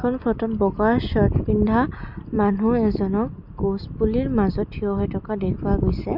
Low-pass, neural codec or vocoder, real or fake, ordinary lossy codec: 5.4 kHz; none; real; none